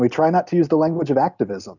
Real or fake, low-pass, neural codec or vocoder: real; 7.2 kHz; none